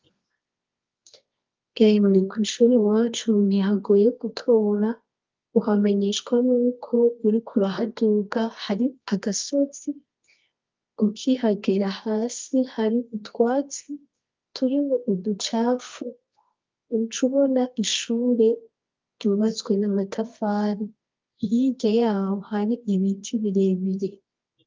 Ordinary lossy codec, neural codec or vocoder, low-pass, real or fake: Opus, 24 kbps; codec, 24 kHz, 0.9 kbps, WavTokenizer, medium music audio release; 7.2 kHz; fake